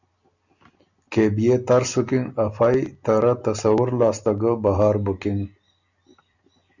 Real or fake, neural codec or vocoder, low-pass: real; none; 7.2 kHz